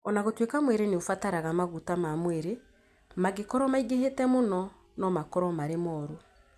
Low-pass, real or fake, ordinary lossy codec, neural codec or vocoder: 14.4 kHz; real; none; none